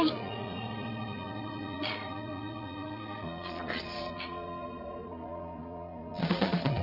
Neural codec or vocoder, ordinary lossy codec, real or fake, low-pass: vocoder, 22.05 kHz, 80 mel bands, Vocos; none; fake; 5.4 kHz